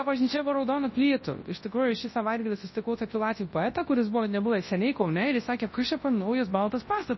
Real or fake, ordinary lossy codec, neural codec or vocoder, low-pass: fake; MP3, 24 kbps; codec, 24 kHz, 0.9 kbps, WavTokenizer, large speech release; 7.2 kHz